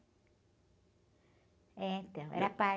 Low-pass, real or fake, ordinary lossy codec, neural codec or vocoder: none; real; none; none